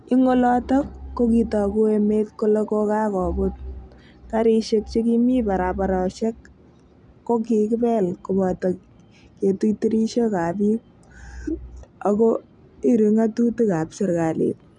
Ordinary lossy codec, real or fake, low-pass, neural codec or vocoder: none; real; 10.8 kHz; none